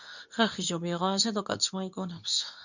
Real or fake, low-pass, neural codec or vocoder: fake; 7.2 kHz; codec, 24 kHz, 0.9 kbps, WavTokenizer, medium speech release version 2